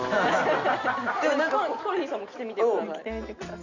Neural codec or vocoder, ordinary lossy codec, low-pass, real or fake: none; none; 7.2 kHz; real